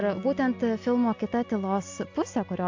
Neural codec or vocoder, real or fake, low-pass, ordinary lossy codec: none; real; 7.2 kHz; AAC, 48 kbps